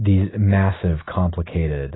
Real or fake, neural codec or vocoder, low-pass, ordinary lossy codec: real; none; 7.2 kHz; AAC, 16 kbps